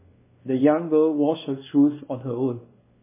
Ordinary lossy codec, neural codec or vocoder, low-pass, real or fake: MP3, 16 kbps; codec, 16 kHz, 2 kbps, X-Codec, WavLM features, trained on Multilingual LibriSpeech; 3.6 kHz; fake